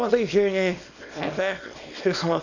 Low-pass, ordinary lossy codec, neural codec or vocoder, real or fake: 7.2 kHz; none; codec, 24 kHz, 0.9 kbps, WavTokenizer, small release; fake